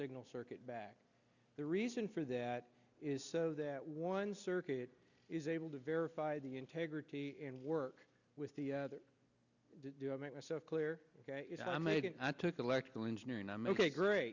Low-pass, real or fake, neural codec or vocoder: 7.2 kHz; real; none